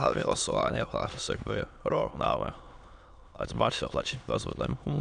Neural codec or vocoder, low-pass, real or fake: autoencoder, 22.05 kHz, a latent of 192 numbers a frame, VITS, trained on many speakers; 9.9 kHz; fake